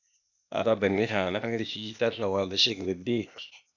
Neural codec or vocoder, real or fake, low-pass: codec, 16 kHz, 0.8 kbps, ZipCodec; fake; 7.2 kHz